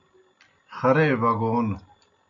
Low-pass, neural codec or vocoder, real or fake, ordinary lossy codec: 7.2 kHz; none; real; AAC, 64 kbps